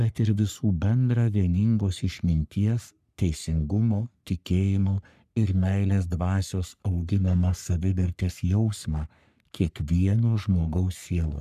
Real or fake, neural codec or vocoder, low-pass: fake; codec, 44.1 kHz, 3.4 kbps, Pupu-Codec; 14.4 kHz